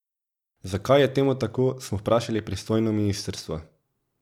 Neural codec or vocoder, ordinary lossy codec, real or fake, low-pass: none; none; real; 19.8 kHz